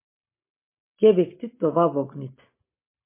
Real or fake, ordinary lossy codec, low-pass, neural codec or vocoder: real; MP3, 24 kbps; 3.6 kHz; none